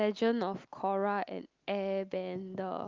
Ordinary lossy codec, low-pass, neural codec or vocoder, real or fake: Opus, 32 kbps; 7.2 kHz; none; real